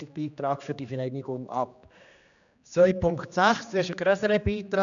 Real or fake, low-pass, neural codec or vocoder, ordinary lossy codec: fake; 7.2 kHz; codec, 16 kHz, 2 kbps, X-Codec, HuBERT features, trained on general audio; none